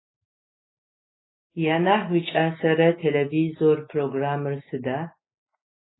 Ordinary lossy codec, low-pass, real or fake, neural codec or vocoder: AAC, 16 kbps; 7.2 kHz; real; none